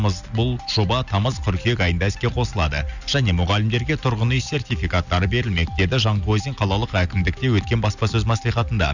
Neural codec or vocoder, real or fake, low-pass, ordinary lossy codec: none; real; 7.2 kHz; none